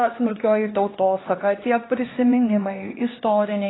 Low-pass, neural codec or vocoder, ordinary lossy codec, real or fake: 7.2 kHz; codec, 16 kHz, 2 kbps, X-Codec, HuBERT features, trained on LibriSpeech; AAC, 16 kbps; fake